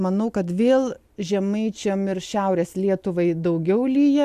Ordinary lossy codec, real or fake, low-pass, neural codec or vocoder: AAC, 96 kbps; real; 14.4 kHz; none